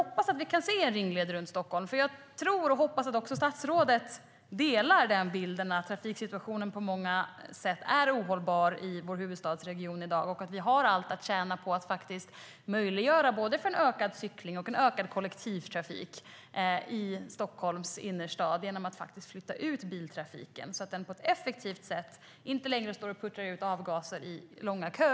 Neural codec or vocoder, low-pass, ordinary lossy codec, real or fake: none; none; none; real